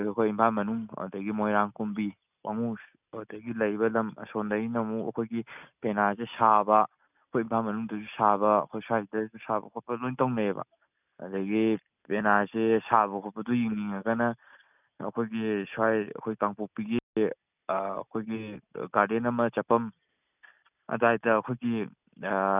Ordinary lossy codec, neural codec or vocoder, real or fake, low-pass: none; none; real; 3.6 kHz